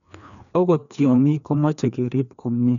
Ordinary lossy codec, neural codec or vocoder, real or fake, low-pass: none; codec, 16 kHz, 2 kbps, FreqCodec, larger model; fake; 7.2 kHz